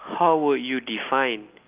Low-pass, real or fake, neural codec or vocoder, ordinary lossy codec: 3.6 kHz; real; none; Opus, 32 kbps